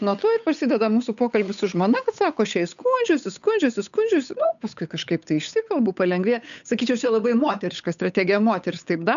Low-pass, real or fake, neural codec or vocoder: 7.2 kHz; fake; codec, 16 kHz, 8 kbps, FunCodec, trained on Chinese and English, 25 frames a second